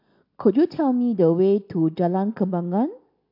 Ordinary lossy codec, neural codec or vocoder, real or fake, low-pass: AAC, 48 kbps; none; real; 5.4 kHz